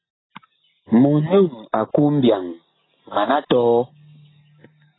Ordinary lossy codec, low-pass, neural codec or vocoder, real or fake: AAC, 16 kbps; 7.2 kHz; none; real